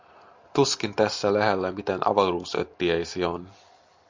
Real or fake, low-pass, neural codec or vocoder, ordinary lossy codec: real; 7.2 kHz; none; MP3, 64 kbps